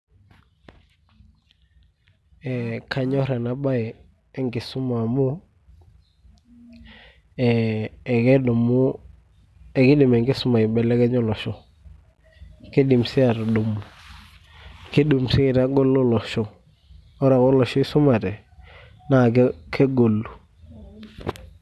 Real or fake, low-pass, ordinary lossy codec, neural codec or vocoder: real; none; none; none